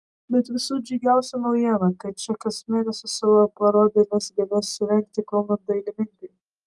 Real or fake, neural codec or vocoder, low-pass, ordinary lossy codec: real; none; 10.8 kHz; Opus, 32 kbps